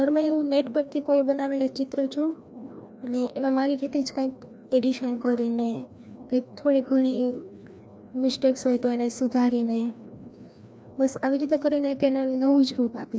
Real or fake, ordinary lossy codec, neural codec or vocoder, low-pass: fake; none; codec, 16 kHz, 1 kbps, FreqCodec, larger model; none